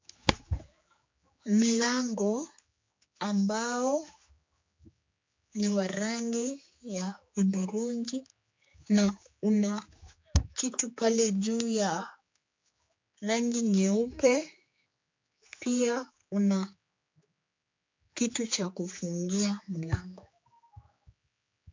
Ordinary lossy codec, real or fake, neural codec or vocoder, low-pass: MP3, 48 kbps; fake; codec, 16 kHz, 4 kbps, X-Codec, HuBERT features, trained on general audio; 7.2 kHz